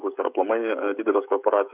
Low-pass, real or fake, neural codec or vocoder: 3.6 kHz; fake; codec, 16 kHz, 16 kbps, FreqCodec, smaller model